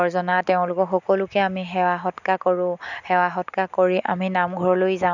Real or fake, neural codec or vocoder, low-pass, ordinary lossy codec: real; none; 7.2 kHz; none